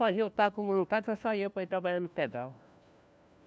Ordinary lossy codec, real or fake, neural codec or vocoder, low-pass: none; fake; codec, 16 kHz, 1 kbps, FunCodec, trained on LibriTTS, 50 frames a second; none